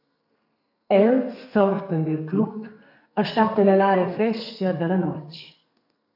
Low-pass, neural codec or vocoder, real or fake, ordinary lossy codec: 5.4 kHz; codec, 32 kHz, 1.9 kbps, SNAC; fake; AAC, 48 kbps